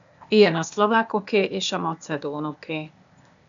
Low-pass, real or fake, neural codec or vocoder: 7.2 kHz; fake; codec, 16 kHz, 0.8 kbps, ZipCodec